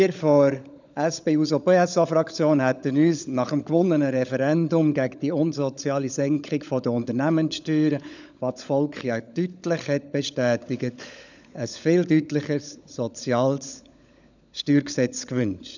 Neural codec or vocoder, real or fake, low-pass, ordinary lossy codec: codec, 16 kHz, 16 kbps, FunCodec, trained on LibriTTS, 50 frames a second; fake; 7.2 kHz; none